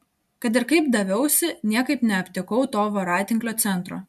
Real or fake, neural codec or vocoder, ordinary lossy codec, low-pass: real; none; MP3, 96 kbps; 14.4 kHz